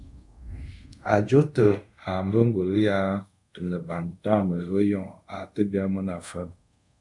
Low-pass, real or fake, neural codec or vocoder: 10.8 kHz; fake; codec, 24 kHz, 0.5 kbps, DualCodec